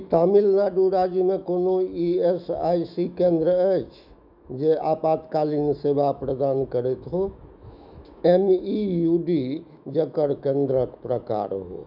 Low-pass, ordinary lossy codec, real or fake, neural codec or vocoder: 5.4 kHz; none; fake; autoencoder, 48 kHz, 128 numbers a frame, DAC-VAE, trained on Japanese speech